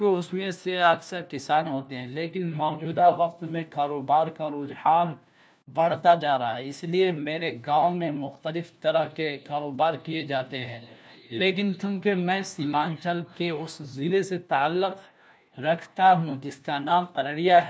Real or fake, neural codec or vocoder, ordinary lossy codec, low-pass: fake; codec, 16 kHz, 1 kbps, FunCodec, trained on LibriTTS, 50 frames a second; none; none